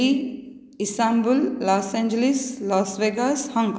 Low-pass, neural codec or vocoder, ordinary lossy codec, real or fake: none; none; none; real